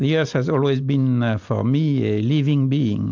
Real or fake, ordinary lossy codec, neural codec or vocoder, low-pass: real; MP3, 64 kbps; none; 7.2 kHz